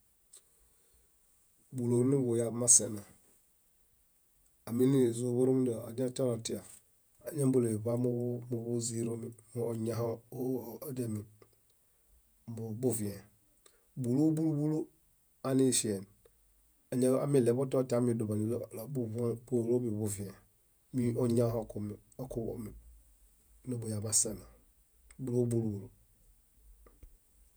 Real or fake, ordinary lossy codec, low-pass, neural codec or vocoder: fake; none; none; vocoder, 48 kHz, 128 mel bands, Vocos